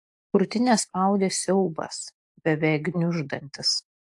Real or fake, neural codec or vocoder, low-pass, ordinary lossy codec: real; none; 10.8 kHz; AAC, 64 kbps